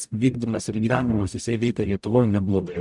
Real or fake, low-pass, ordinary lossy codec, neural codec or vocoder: fake; 10.8 kHz; MP3, 96 kbps; codec, 44.1 kHz, 0.9 kbps, DAC